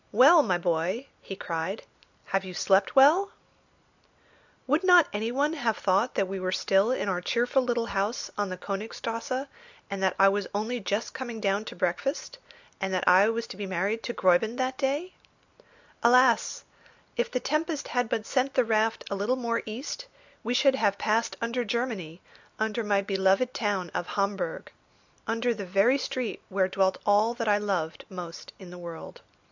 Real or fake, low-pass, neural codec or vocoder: real; 7.2 kHz; none